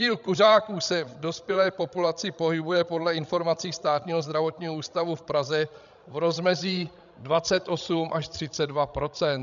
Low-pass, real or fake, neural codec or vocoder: 7.2 kHz; fake; codec, 16 kHz, 16 kbps, FreqCodec, larger model